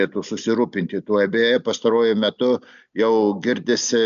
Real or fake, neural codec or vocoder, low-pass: real; none; 7.2 kHz